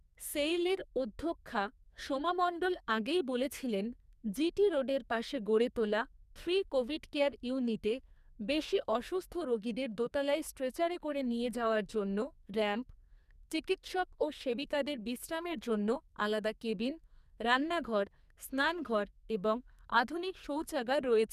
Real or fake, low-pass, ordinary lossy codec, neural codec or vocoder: fake; 14.4 kHz; none; codec, 44.1 kHz, 2.6 kbps, SNAC